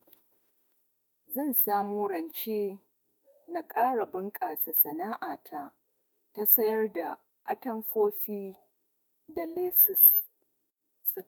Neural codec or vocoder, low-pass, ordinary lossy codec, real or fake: autoencoder, 48 kHz, 32 numbers a frame, DAC-VAE, trained on Japanese speech; none; none; fake